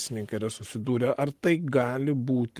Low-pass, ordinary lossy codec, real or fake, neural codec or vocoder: 14.4 kHz; Opus, 24 kbps; fake; codec, 44.1 kHz, 7.8 kbps, Pupu-Codec